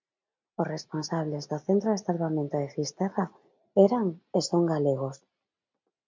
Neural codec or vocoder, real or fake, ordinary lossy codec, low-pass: none; real; MP3, 64 kbps; 7.2 kHz